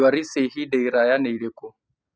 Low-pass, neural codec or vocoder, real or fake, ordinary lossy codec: none; none; real; none